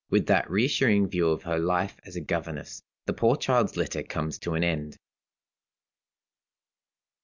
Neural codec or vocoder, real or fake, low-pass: none; real; 7.2 kHz